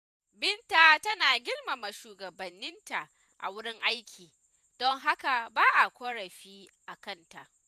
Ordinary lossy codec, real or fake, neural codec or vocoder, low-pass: none; fake; vocoder, 48 kHz, 128 mel bands, Vocos; 14.4 kHz